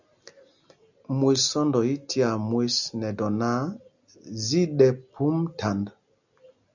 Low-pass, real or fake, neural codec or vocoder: 7.2 kHz; real; none